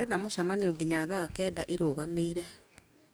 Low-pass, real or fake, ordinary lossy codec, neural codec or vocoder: none; fake; none; codec, 44.1 kHz, 2.6 kbps, DAC